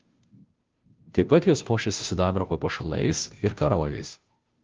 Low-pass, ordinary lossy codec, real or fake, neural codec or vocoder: 7.2 kHz; Opus, 32 kbps; fake; codec, 16 kHz, 0.5 kbps, FunCodec, trained on Chinese and English, 25 frames a second